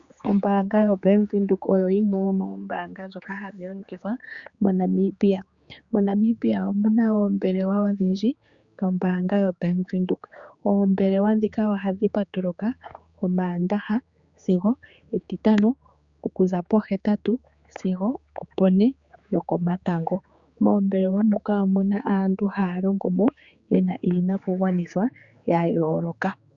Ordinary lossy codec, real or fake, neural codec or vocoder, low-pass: Opus, 64 kbps; fake; codec, 16 kHz, 4 kbps, X-Codec, HuBERT features, trained on general audio; 7.2 kHz